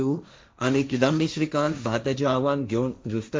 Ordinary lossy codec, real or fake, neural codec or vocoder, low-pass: none; fake; codec, 16 kHz, 1.1 kbps, Voila-Tokenizer; none